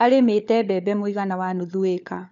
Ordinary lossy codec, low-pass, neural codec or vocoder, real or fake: none; 7.2 kHz; codec, 16 kHz, 4 kbps, FunCodec, trained on LibriTTS, 50 frames a second; fake